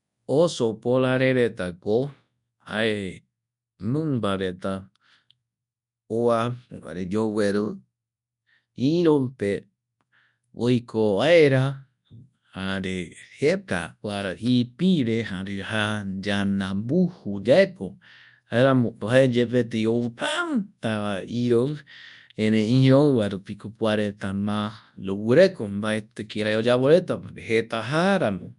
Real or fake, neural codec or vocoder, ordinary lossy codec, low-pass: fake; codec, 24 kHz, 0.9 kbps, WavTokenizer, large speech release; none; 10.8 kHz